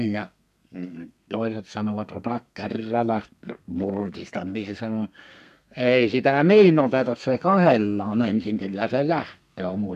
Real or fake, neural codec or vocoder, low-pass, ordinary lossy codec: fake; codec, 32 kHz, 1.9 kbps, SNAC; 14.4 kHz; none